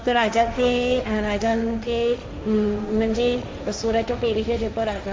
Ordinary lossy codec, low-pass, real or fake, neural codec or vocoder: none; none; fake; codec, 16 kHz, 1.1 kbps, Voila-Tokenizer